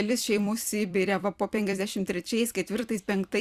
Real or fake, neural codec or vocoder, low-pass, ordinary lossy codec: fake; vocoder, 44.1 kHz, 128 mel bands every 256 samples, BigVGAN v2; 14.4 kHz; Opus, 64 kbps